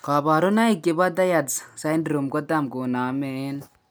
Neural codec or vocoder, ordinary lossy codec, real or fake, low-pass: none; none; real; none